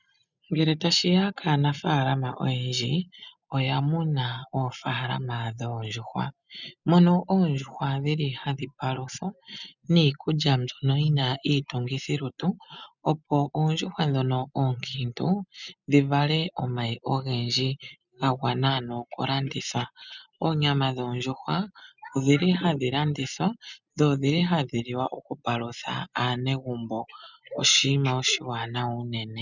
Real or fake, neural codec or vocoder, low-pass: real; none; 7.2 kHz